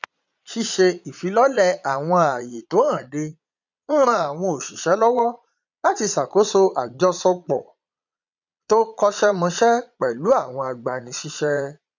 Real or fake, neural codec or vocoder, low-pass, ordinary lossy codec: fake; vocoder, 22.05 kHz, 80 mel bands, Vocos; 7.2 kHz; none